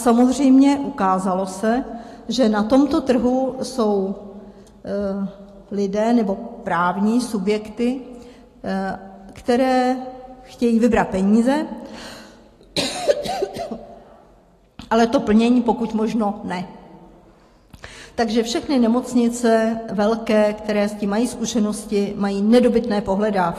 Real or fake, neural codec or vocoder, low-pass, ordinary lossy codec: real; none; 14.4 kHz; AAC, 48 kbps